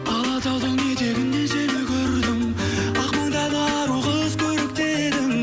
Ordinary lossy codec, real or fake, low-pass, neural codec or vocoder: none; real; none; none